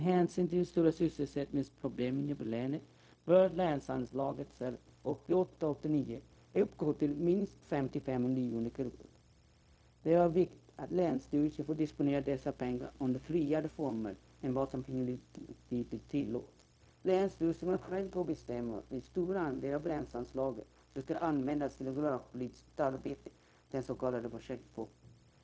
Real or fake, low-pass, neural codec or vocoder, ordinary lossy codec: fake; none; codec, 16 kHz, 0.4 kbps, LongCat-Audio-Codec; none